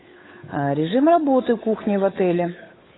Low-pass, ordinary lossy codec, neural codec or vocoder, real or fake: 7.2 kHz; AAC, 16 kbps; codec, 16 kHz, 8 kbps, FunCodec, trained on Chinese and English, 25 frames a second; fake